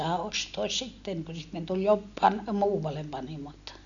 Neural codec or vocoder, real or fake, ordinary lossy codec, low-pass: none; real; none; 7.2 kHz